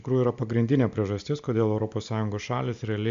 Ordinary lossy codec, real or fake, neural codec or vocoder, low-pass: MP3, 64 kbps; real; none; 7.2 kHz